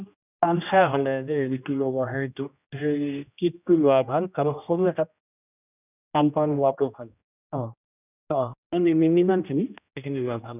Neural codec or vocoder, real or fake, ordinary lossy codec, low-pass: codec, 16 kHz, 1 kbps, X-Codec, HuBERT features, trained on general audio; fake; none; 3.6 kHz